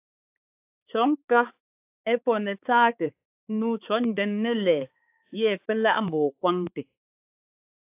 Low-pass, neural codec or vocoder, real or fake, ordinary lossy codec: 3.6 kHz; codec, 16 kHz, 4 kbps, X-Codec, HuBERT features, trained on balanced general audio; fake; AAC, 32 kbps